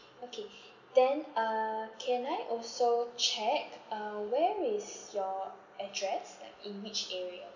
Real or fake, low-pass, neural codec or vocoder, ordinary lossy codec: real; 7.2 kHz; none; none